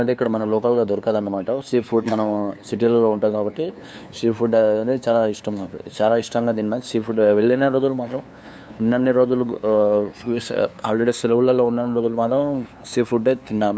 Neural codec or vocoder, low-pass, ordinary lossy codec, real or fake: codec, 16 kHz, 2 kbps, FunCodec, trained on LibriTTS, 25 frames a second; none; none; fake